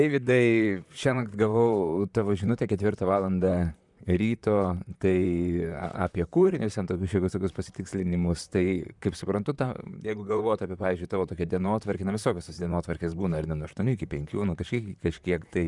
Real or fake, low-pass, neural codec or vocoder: fake; 10.8 kHz; vocoder, 44.1 kHz, 128 mel bands, Pupu-Vocoder